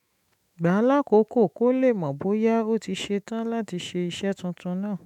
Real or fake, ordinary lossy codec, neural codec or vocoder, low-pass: fake; none; autoencoder, 48 kHz, 128 numbers a frame, DAC-VAE, trained on Japanese speech; 19.8 kHz